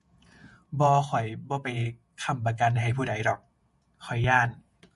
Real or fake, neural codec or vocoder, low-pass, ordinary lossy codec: fake; vocoder, 48 kHz, 128 mel bands, Vocos; 14.4 kHz; MP3, 48 kbps